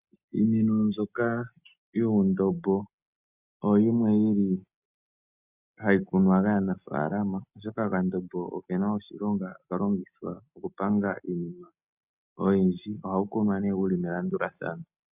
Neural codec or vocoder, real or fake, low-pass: none; real; 3.6 kHz